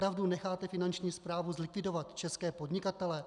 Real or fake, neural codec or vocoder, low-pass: real; none; 10.8 kHz